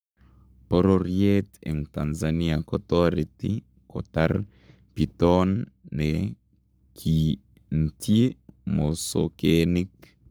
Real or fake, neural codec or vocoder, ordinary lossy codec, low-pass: fake; codec, 44.1 kHz, 7.8 kbps, Pupu-Codec; none; none